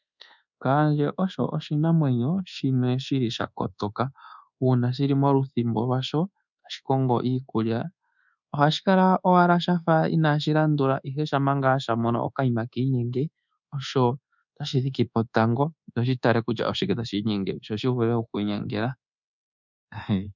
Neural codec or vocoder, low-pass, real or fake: codec, 24 kHz, 1.2 kbps, DualCodec; 7.2 kHz; fake